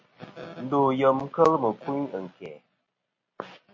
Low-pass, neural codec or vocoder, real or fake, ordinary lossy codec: 7.2 kHz; none; real; MP3, 32 kbps